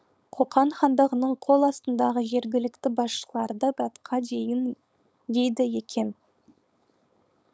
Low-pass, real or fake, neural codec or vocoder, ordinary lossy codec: none; fake; codec, 16 kHz, 4.8 kbps, FACodec; none